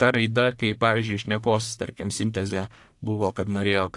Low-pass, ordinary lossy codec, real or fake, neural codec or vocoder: 10.8 kHz; AAC, 64 kbps; fake; codec, 44.1 kHz, 1.7 kbps, Pupu-Codec